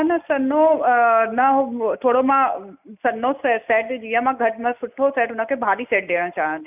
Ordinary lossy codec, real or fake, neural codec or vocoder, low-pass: none; real; none; 3.6 kHz